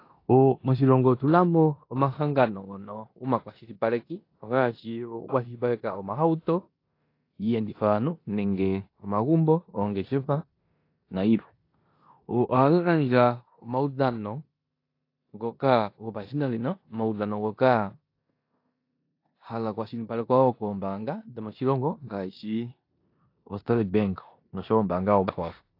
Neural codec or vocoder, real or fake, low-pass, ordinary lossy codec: codec, 16 kHz in and 24 kHz out, 0.9 kbps, LongCat-Audio-Codec, four codebook decoder; fake; 5.4 kHz; AAC, 32 kbps